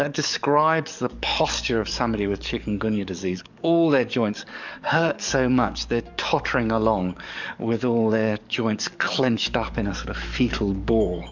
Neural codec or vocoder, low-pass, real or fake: codec, 44.1 kHz, 7.8 kbps, DAC; 7.2 kHz; fake